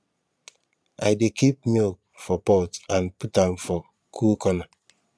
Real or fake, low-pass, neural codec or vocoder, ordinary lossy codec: fake; none; vocoder, 22.05 kHz, 80 mel bands, Vocos; none